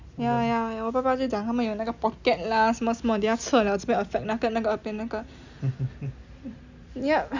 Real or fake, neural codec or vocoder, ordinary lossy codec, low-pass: real; none; Opus, 64 kbps; 7.2 kHz